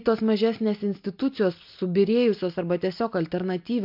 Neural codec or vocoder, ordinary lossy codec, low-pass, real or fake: none; MP3, 48 kbps; 5.4 kHz; real